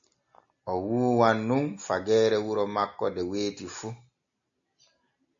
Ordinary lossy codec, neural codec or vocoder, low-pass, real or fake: AAC, 64 kbps; none; 7.2 kHz; real